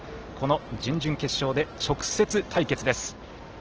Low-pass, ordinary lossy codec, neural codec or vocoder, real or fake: 7.2 kHz; Opus, 16 kbps; none; real